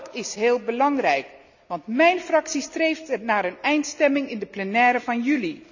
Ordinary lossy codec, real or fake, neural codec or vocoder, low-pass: none; real; none; 7.2 kHz